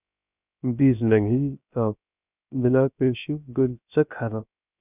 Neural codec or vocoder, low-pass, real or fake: codec, 16 kHz, 0.3 kbps, FocalCodec; 3.6 kHz; fake